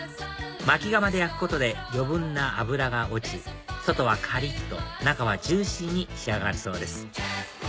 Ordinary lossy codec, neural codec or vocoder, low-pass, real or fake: none; none; none; real